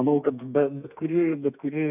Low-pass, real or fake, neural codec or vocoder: 3.6 kHz; fake; codec, 32 kHz, 1.9 kbps, SNAC